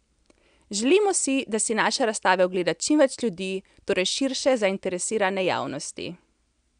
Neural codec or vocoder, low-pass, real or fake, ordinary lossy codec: none; 9.9 kHz; real; none